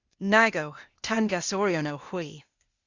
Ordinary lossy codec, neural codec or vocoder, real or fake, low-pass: Opus, 64 kbps; codec, 16 kHz, 0.8 kbps, ZipCodec; fake; 7.2 kHz